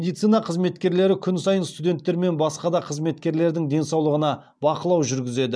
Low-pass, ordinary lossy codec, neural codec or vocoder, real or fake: none; none; none; real